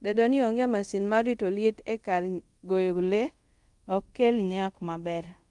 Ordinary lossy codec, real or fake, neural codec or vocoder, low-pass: Opus, 32 kbps; fake; codec, 24 kHz, 0.5 kbps, DualCodec; 10.8 kHz